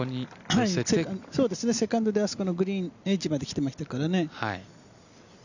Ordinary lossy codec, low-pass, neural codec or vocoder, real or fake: none; 7.2 kHz; none; real